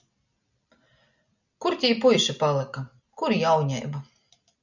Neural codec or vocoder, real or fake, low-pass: none; real; 7.2 kHz